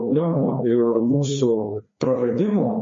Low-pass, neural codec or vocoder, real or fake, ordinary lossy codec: 7.2 kHz; codec, 16 kHz, 2 kbps, FreqCodec, larger model; fake; MP3, 32 kbps